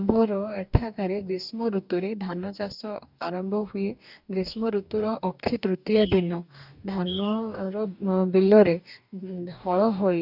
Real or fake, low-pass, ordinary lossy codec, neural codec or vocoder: fake; 5.4 kHz; none; codec, 44.1 kHz, 2.6 kbps, DAC